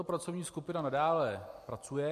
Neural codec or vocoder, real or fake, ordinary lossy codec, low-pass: none; real; MP3, 64 kbps; 14.4 kHz